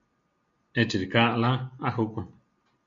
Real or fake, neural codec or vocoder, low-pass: real; none; 7.2 kHz